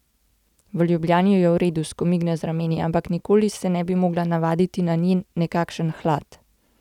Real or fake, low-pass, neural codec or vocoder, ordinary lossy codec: real; 19.8 kHz; none; none